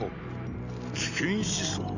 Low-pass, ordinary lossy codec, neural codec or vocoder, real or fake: 7.2 kHz; none; none; real